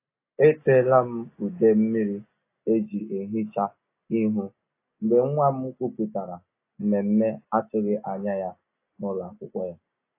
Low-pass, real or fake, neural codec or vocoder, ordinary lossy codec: 3.6 kHz; real; none; AAC, 24 kbps